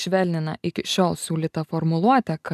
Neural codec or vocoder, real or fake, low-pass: none; real; 14.4 kHz